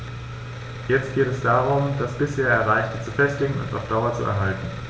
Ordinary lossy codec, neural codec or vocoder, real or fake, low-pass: none; none; real; none